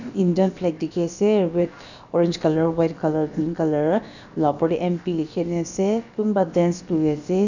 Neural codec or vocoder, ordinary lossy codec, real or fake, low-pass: codec, 16 kHz, about 1 kbps, DyCAST, with the encoder's durations; none; fake; 7.2 kHz